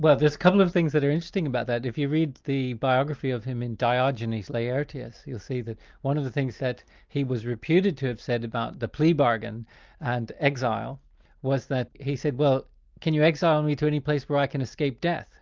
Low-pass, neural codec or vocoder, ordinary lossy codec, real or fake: 7.2 kHz; none; Opus, 24 kbps; real